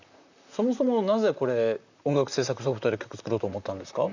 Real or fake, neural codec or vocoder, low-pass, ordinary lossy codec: fake; vocoder, 44.1 kHz, 128 mel bands, Pupu-Vocoder; 7.2 kHz; none